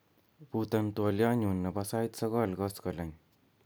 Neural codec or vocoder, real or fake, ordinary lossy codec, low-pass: none; real; none; none